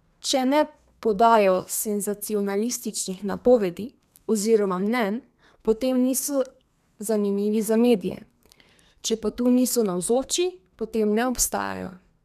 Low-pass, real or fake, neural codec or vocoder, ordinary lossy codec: 14.4 kHz; fake; codec, 32 kHz, 1.9 kbps, SNAC; none